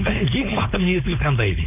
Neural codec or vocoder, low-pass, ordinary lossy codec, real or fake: codec, 16 kHz, 4.8 kbps, FACodec; 3.6 kHz; none; fake